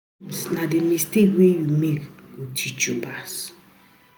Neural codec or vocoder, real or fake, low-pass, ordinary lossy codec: none; real; none; none